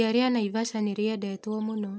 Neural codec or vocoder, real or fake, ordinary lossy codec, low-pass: none; real; none; none